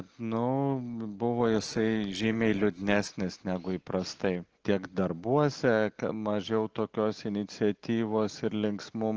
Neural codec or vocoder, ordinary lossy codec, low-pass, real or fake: none; Opus, 16 kbps; 7.2 kHz; real